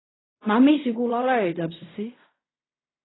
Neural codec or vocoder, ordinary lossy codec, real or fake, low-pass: codec, 16 kHz in and 24 kHz out, 0.4 kbps, LongCat-Audio-Codec, fine tuned four codebook decoder; AAC, 16 kbps; fake; 7.2 kHz